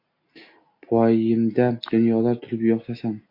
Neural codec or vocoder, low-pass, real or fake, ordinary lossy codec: none; 5.4 kHz; real; MP3, 32 kbps